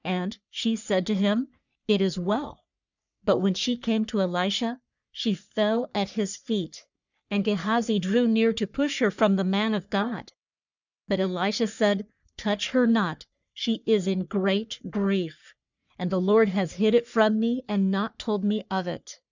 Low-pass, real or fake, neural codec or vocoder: 7.2 kHz; fake; codec, 44.1 kHz, 3.4 kbps, Pupu-Codec